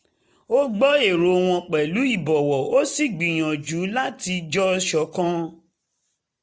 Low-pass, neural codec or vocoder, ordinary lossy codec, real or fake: none; none; none; real